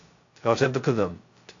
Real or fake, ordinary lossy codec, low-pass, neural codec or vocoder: fake; AAC, 48 kbps; 7.2 kHz; codec, 16 kHz, 0.2 kbps, FocalCodec